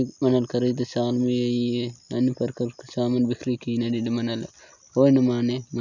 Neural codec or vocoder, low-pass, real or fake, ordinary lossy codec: none; 7.2 kHz; real; none